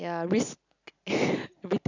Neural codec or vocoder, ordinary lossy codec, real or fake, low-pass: none; none; real; 7.2 kHz